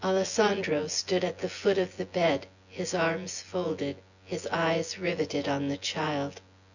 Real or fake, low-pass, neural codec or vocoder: fake; 7.2 kHz; vocoder, 24 kHz, 100 mel bands, Vocos